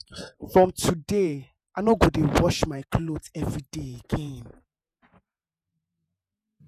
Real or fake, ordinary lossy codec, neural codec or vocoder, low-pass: fake; none; vocoder, 48 kHz, 128 mel bands, Vocos; 14.4 kHz